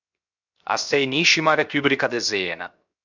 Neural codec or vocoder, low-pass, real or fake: codec, 16 kHz, 0.7 kbps, FocalCodec; 7.2 kHz; fake